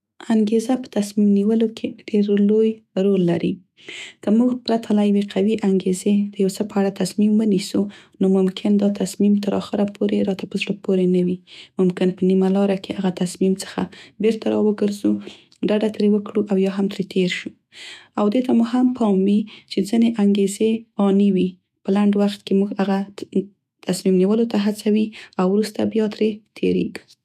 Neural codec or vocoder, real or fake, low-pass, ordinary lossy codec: autoencoder, 48 kHz, 128 numbers a frame, DAC-VAE, trained on Japanese speech; fake; 14.4 kHz; none